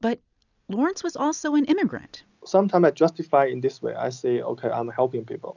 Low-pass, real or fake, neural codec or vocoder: 7.2 kHz; real; none